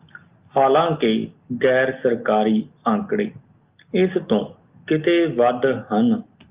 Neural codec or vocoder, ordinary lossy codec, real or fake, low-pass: none; Opus, 32 kbps; real; 3.6 kHz